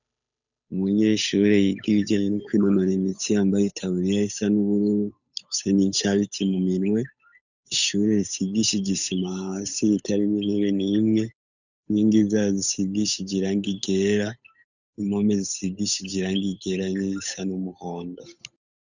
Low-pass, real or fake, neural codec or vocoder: 7.2 kHz; fake; codec, 16 kHz, 8 kbps, FunCodec, trained on Chinese and English, 25 frames a second